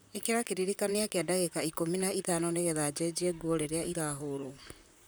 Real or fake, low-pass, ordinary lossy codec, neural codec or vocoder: fake; none; none; vocoder, 44.1 kHz, 128 mel bands, Pupu-Vocoder